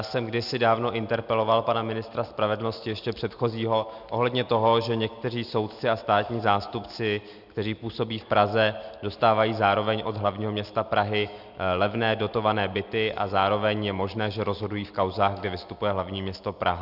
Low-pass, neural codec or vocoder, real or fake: 5.4 kHz; none; real